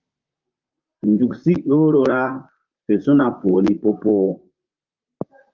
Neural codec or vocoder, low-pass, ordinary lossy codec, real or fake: vocoder, 44.1 kHz, 128 mel bands, Pupu-Vocoder; 7.2 kHz; Opus, 24 kbps; fake